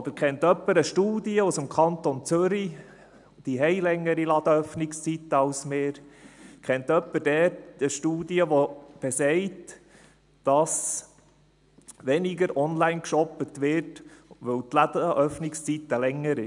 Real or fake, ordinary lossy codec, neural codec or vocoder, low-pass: real; none; none; 10.8 kHz